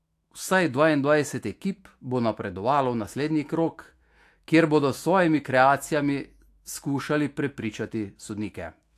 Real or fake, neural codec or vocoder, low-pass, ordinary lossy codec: fake; autoencoder, 48 kHz, 128 numbers a frame, DAC-VAE, trained on Japanese speech; 14.4 kHz; AAC, 64 kbps